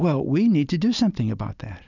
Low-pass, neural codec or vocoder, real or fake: 7.2 kHz; none; real